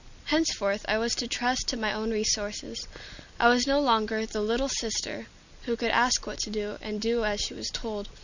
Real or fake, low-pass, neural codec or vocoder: real; 7.2 kHz; none